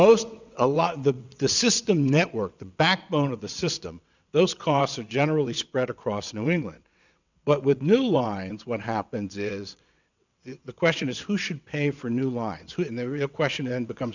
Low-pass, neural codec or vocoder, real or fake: 7.2 kHz; vocoder, 22.05 kHz, 80 mel bands, WaveNeXt; fake